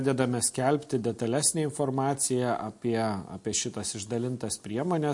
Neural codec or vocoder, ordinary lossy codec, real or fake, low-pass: none; MP3, 48 kbps; real; 10.8 kHz